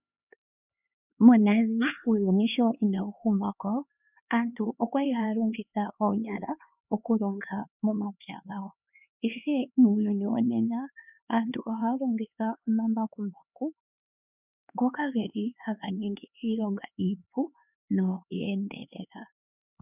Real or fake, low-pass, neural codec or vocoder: fake; 3.6 kHz; codec, 16 kHz, 4 kbps, X-Codec, HuBERT features, trained on LibriSpeech